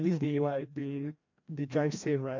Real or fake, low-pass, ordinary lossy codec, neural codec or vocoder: fake; 7.2 kHz; MP3, 64 kbps; codec, 16 kHz, 1 kbps, FreqCodec, larger model